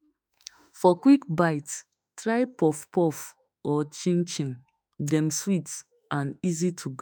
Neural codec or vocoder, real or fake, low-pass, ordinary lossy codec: autoencoder, 48 kHz, 32 numbers a frame, DAC-VAE, trained on Japanese speech; fake; none; none